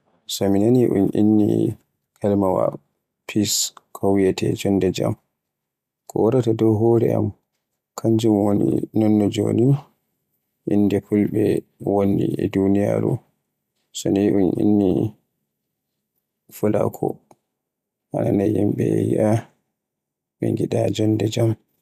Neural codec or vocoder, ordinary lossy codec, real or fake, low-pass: none; none; real; 14.4 kHz